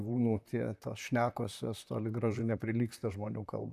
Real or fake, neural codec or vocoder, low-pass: fake; vocoder, 44.1 kHz, 128 mel bands, Pupu-Vocoder; 14.4 kHz